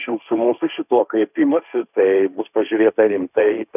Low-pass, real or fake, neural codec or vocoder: 3.6 kHz; fake; codec, 16 kHz, 1.1 kbps, Voila-Tokenizer